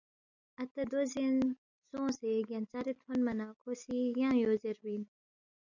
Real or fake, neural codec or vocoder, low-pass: real; none; 7.2 kHz